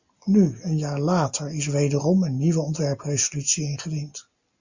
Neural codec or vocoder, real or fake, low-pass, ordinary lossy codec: none; real; 7.2 kHz; Opus, 64 kbps